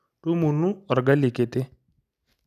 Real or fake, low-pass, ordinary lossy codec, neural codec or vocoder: real; 14.4 kHz; none; none